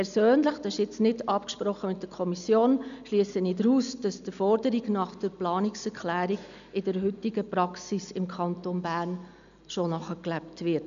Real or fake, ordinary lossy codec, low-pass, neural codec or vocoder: real; none; 7.2 kHz; none